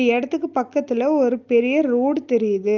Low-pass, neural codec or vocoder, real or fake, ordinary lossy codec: 7.2 kHz; none; real; Opus, 24 kbps